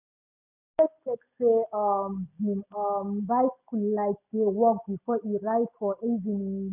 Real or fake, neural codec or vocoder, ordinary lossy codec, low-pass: real; none; none; 3.6 kHz